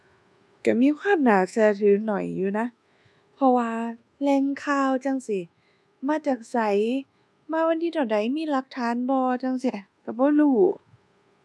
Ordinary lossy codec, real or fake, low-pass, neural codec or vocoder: none; fake; none; codec, 24 kHz, 1.2 kbps, DualCodec